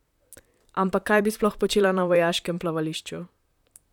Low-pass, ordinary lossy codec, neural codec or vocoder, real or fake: 19.8 kHz; none; vocoder, 44.1 kHz, 128 mel bands, Pupu-Vocoder; fake